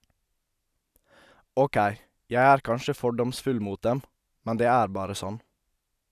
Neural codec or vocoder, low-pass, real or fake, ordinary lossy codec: none; 14.4 kHz; real; none